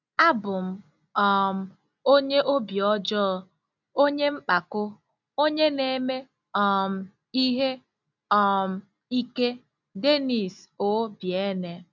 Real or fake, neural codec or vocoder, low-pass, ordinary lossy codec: real; none; 7.2 kHz; none